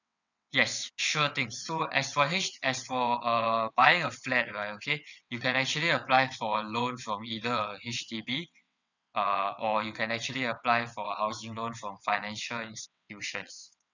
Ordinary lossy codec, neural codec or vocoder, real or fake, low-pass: none; vocoder, 22.05 kHz, 80 mel bands, WaveNeXt; fake; 7.2 kHz